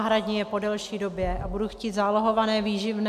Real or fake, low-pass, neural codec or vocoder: real; 14.4 kHz; none